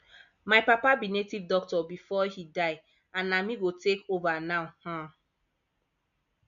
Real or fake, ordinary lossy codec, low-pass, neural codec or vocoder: real; none; 7.2 kHz; none